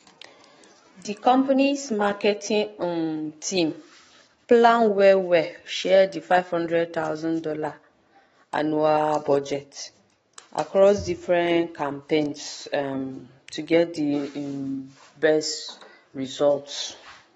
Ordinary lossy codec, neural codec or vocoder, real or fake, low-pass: AAC, 24 kbps; autoencoder, 48 kHz, 128 numbers a frame, DAC-VAE, trained on Japanese speech; fake; 19.8 kHz